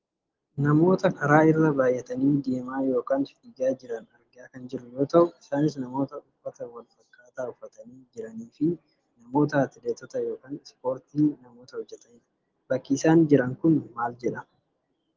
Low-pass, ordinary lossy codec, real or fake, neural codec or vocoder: 7.2 kHz; Opus, 16 kbps; real; none